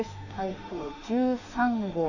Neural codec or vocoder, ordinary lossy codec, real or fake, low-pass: autoencoder, 48 kHz, 32 numbers a frame, DAC-VAE, trained on Japanese speech; none; fake; 7.2 kHz